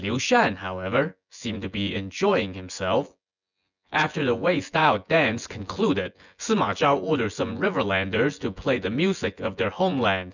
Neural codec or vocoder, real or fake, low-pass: vocoder, 24 kHz, 100 mel bands, Vocos; fake; 7.2 kHz